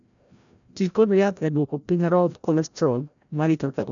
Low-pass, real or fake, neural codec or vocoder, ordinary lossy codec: 7.2 kHz; fake; codec, 16 kHz, 0.5 kbps, FreqCodec, larger model; none